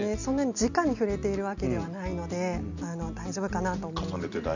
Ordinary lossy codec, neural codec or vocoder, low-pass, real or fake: none; none; 7.2 kHz; real